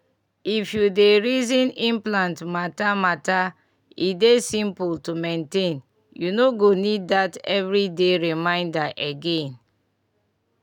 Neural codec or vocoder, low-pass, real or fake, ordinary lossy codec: none; 19.8 kHz; real; none